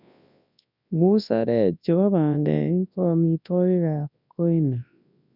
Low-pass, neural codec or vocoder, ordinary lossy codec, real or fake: 5.4 kHz; codec, 24 kHz, 0.9 kbps, WavTokenizer, large speech release; none; fake